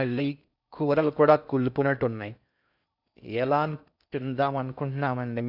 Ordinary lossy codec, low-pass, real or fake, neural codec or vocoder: none; 5.4 kHz; fake; codec, 16 kHz in and 24 kHz out, 0.6 kbps, FocalCodec, streaming, 4096 codes